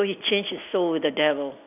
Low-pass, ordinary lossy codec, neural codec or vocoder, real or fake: 3.6 kHz; none; none; real